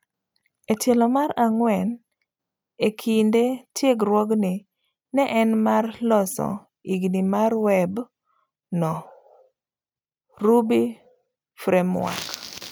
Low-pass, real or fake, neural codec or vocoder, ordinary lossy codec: none; real; none; none